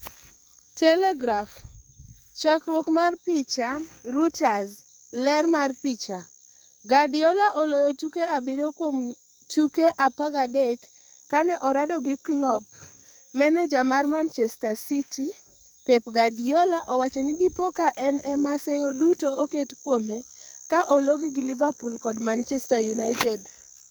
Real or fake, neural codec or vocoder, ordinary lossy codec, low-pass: fake; codec, 44.1 kHz, 2.6 kbps, SNAC; none; none